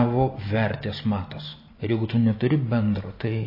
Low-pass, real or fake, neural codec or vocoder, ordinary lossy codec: 5.4 kHz; real; none; MP3, 24 kbps